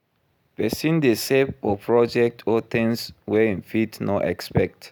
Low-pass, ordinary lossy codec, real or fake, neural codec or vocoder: none; none; real; none